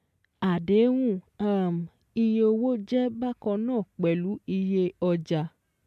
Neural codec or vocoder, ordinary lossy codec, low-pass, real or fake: none; MP3, 96 kbps; 14.4 kHz; real